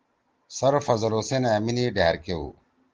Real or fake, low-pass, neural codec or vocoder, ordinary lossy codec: real; 7.2 kHz; none; Opus, 16 kbps